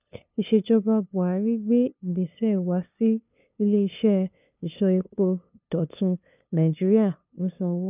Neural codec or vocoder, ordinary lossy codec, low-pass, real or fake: codec, 16 kHz, 2 kbps, FunCodec, trained on LibriTTS, 25 frames a second; none; 3.6 kHz; fake